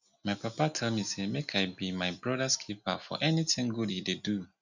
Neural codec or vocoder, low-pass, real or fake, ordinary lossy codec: none; 7.2 kHz; real; none